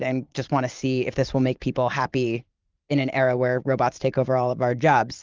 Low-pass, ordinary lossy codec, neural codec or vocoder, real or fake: 7.2 kHz; Opus, 24 kbps; vocoder, 44.1 kHz, 128 mel bands every 512 samples, BigVGAN v2; fake